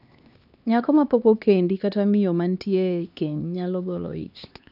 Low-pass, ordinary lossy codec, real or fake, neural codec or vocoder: 5.4 kHz; none; fake; codec, 16 kHz, 2 kbps, X-Codec, HuBERT features, trained on LibriSpeech